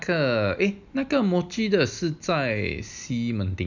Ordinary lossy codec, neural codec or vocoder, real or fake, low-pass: none; none; real; 7.2 kHz